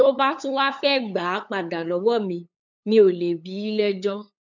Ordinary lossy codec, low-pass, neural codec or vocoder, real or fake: none; 7.2 kHz; codec, 16 kHz, 8 kbps, FunCodec, trained on LibriTTS, 25 frames a second; fake